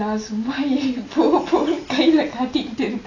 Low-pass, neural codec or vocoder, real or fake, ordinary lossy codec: 7.2 kHz; none; real; AAC, 32 kbps